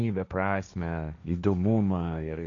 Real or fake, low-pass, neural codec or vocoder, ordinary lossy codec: fake; 7.2 kHz; codec, 16 kHz, 1.1 kbps, Voila-Tokenizer; MP3, 96 kbps